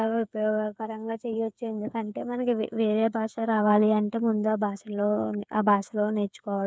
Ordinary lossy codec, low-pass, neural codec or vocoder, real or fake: none; none; codec, 16 kHz, 8 kbps, FreqCodec, smaller model; fake